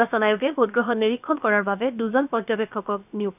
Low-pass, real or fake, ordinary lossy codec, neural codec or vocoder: 3.6 kHz; fake; none; codec, 16 kHz, about 1 kbps, DyCAST, with the encoder's durations